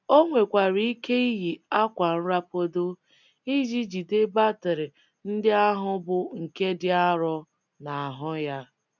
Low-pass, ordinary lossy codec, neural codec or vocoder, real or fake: 7.2 kHz; none; none; real